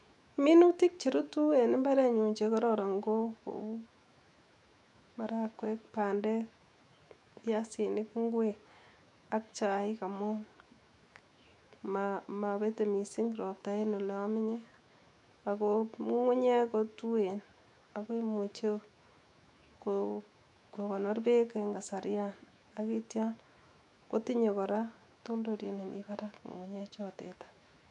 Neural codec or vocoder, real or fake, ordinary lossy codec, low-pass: autoencoder, 48 kHz, 128 numbers a frame, DAC-VAE, trained on Japanese speech; fake; none; 10.8 kHz